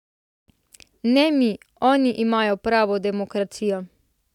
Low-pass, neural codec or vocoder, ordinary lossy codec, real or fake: 19.8 kHz; none; none; real